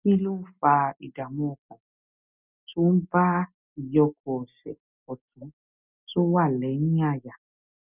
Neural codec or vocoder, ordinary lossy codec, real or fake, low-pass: none; none; real; 3.6 kHz